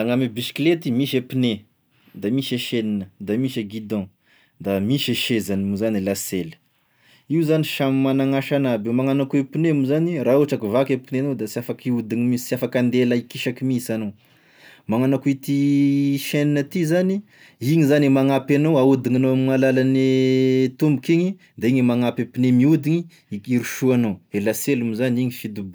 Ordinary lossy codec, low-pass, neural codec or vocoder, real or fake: none; none; none; real